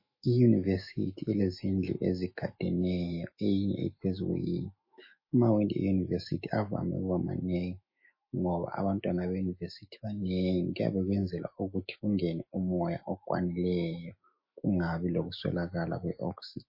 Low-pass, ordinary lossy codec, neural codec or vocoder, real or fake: 5.4 kHz; MP3, 24 kbps; autoencoder, 48 kHz, 128 numbers a frame, DAC-VAE, trained on Japanese speech; fake